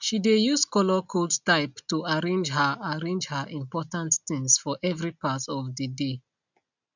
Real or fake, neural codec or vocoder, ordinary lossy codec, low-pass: real; none; none; 7.2 kHz